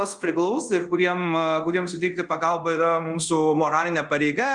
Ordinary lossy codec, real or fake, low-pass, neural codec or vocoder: Opus, 32 kbps; fake; 10.8 kHz; codec, 24 kHz, 0.5 kbps, DualCodec